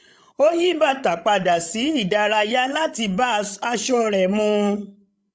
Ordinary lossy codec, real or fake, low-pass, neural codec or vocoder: none; fake; none; codec, 16 kHz, 16 kbps, FreqCodec, larger model